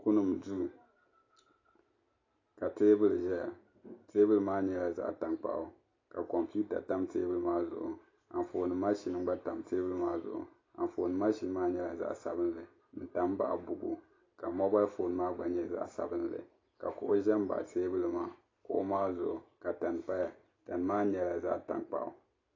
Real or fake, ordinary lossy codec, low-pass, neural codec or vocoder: real; AAC, 32 kbps; 7.2 kHz; none